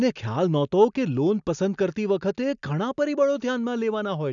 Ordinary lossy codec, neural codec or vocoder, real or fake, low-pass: none; none; real; 7.2 kHz